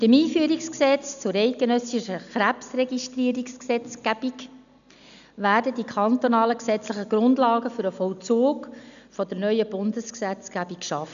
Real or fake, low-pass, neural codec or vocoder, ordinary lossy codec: real; 7.2 kHz; none; none